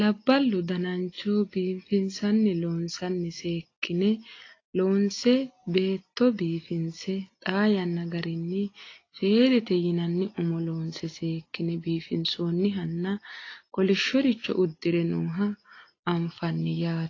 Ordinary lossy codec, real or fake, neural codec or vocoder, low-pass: AAC, 32 kbps; real; none; 7.2 kHz